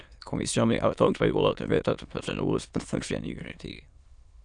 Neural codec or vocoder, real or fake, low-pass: autoencoder, 22.05 kHz, a latent of 192 numbers a frame, VITS, trained on many speakers; fake; 9.9 kHz